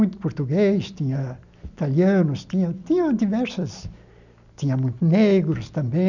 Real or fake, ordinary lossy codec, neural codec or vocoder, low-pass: real; none; none; 7.2 kHz